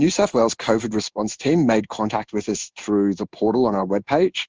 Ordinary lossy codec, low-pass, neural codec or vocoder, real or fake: Opus, 24 kbps; 7.2 kHz; codec, 16 kHz in and 24 kHz out, 1 kbps, XY-Tokenizer; fake